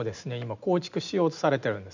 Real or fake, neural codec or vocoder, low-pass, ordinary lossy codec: real; none; 7.2 kHz; none